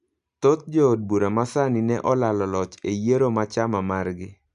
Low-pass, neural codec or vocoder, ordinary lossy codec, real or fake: 10.8 kHz; none; none; real